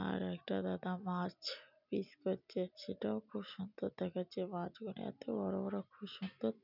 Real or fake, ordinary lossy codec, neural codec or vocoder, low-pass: real; none; none; 5.4 kHz